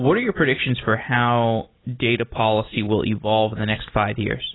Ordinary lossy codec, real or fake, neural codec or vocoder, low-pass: AAC, 16 kbps; real; none; 7.2 kHz